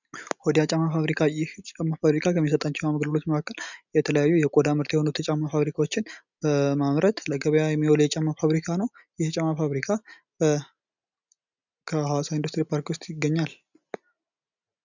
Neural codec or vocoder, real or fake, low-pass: none; real; 7.2 kHz